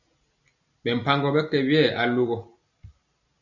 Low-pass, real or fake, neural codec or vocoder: 7.2 kHz; real; none